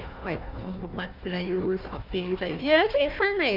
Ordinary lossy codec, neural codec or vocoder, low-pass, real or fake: none; codec, 16 kHz, 1 kbps, FunCodec, trained on Chinese and English, 50 frames a second; 5.4 kHz; fake